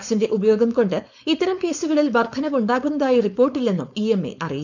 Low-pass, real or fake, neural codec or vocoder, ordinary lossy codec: 7.2 kHz; fake; codec, 16 kHz, 4.8 kbps, FACodec; none